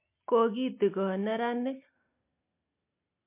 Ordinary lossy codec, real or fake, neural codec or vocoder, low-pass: MP3, 24 kbps; real; none; 3.6 kHz